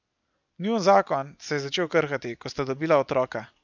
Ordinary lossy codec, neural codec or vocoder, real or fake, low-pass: none; none; real; 7.2 kHz